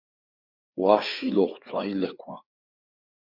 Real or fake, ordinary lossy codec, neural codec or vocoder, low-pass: fake; Opus, 64 kbps; codec, 16 kHz, 4 kbps, FreqCodec, larger model; 5.4 kHz